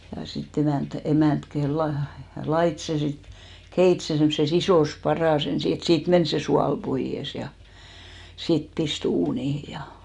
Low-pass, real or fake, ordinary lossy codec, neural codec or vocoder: 10.8 kHz; real; none; none